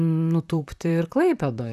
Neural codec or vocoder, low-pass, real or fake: vocoder, 44.1 kHz, 128 mel bands, Pupu-Vocoder; 14.4 kHz; fake